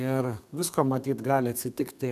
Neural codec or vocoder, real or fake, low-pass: codec, 32 kHz, 1.9 kbps, SNAC; fake; 14.4 kHz